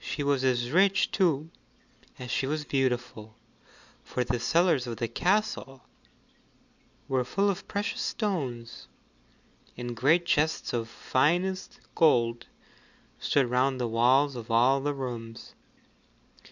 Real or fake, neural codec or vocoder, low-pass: real; none; 7.2 kHz